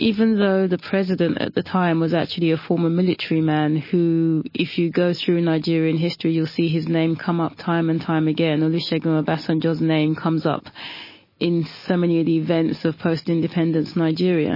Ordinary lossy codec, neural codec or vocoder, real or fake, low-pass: MP3, 24 kbps; none; real; 5.4 kHz